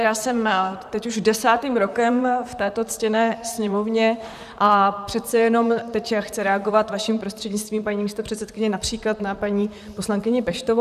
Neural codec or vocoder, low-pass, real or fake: vocoder, 44.1 kHz, 128 mel bands, Pupu-Vocoder; 14.4 kHz; fake